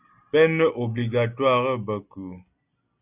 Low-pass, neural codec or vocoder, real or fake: 3.6 kHz; none; real